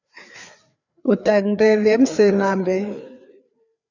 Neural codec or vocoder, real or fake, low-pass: codec, 16 kHz, 4 kbps, FreqCodec, larger model; fake; 7.2 kHz